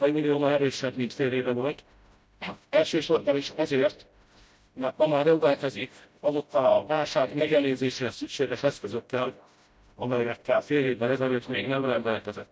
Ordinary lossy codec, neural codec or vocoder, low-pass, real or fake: none; codec, 16 kHz, 0.5 kbps, FreqCodec, smaller model; none; fake